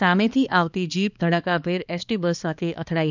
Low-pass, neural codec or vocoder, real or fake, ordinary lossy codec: 7.2 kHz; codec, 16 kHz, 2 kbps, X-Codec, HuBERT features, trained on balanced general audio; fake; none